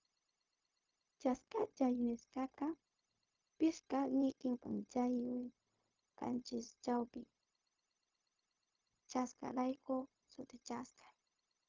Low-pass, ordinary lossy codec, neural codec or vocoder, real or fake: 7.2 kHz; Opus, 24 kbps; codec, 16 kHz, 0.4 kbps, LongCat-Audio-Codec; fake